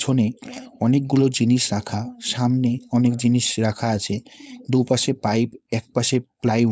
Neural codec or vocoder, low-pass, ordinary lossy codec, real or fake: codec, 16 kHz, 4.8 kbps, FACodec; none; none; fake